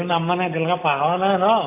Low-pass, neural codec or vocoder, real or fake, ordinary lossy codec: 3.6 kHz; none; real; AAC, 24 kbps